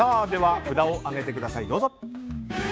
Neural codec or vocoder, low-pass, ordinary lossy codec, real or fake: codec, 16 kHz, 6 kbps, DAC; none; none; fake